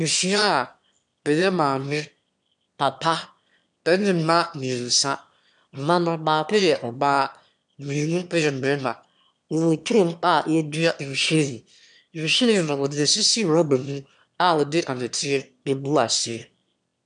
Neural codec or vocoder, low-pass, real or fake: autoencoder, 22.05 kHz, a latent of 192 numbers a frame, VITS, trained on one speaker; 9.9 kHz; fake